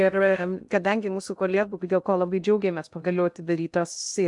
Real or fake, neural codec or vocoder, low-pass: fake; codec, 16 kHz in and 24 kHz out, 0.6 kbps, FocalCodec, streaming, 2048 codes; 10.8 kHz